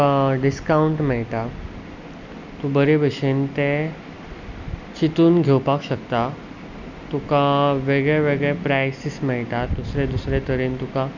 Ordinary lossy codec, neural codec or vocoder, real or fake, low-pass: none; none; real; 7.2 kHz